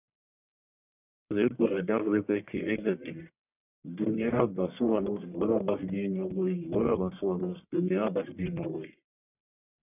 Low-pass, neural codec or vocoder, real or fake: 3.6 kHz; codec, 44.1 kHz, 1.7 kbps, Pupu-Codec; fake